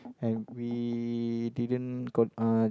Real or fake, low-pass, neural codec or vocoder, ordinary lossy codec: real; none; none; none